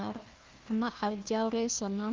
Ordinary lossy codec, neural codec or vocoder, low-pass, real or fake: Opus, 32 kbps; codec, 16 kHz, 1 kbps, FunCodec, trained on Chinese and English, 50 frames a second; 7.2 kHz; fake